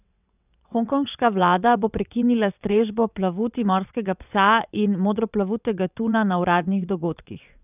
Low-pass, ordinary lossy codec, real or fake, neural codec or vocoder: 3.6 kHz; none; fake; vocoder, 22.05 kHz, 80 mel bands, WaveNeXt